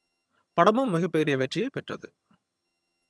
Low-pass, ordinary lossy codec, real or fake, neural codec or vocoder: none; none; fake; vocoder, 22.05 kHz, 80 mel bands, HiFi-GAN